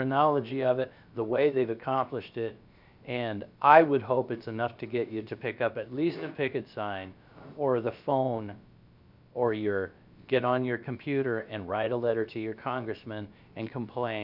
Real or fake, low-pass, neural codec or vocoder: fake; 5.4 kHz; codec, 16 kHz, about 1 kbps, DyCAST, with the encoder's durations